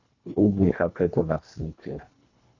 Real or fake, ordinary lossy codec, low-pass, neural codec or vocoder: fake; Opus, 64 kbps; 7.2 kHz; codec, 24 kHz, 1.5 kbps, HILCodec